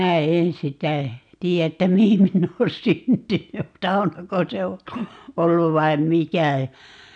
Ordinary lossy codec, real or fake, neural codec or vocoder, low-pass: none; real; none; 9.9 kHz